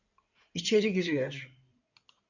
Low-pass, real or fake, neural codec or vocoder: 7.2 kHz; fake; codec, 16 kHz in and 24 kHz out, 2.2 kbps, FireRedTTS-2 codec